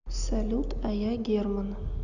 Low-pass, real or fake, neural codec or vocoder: 7.2 kHz; real; none